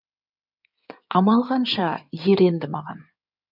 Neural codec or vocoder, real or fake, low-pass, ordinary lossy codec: codec, 16 kHz in and 24 kHz out, 2.2 kbps, FireRedTTS-2 codec; fake; 5.4 kHz; none